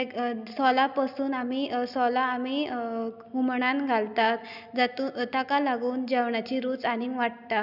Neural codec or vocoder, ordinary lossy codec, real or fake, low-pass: none; none; real; 5.4 kHz